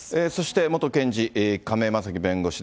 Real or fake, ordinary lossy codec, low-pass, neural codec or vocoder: real; none; none; none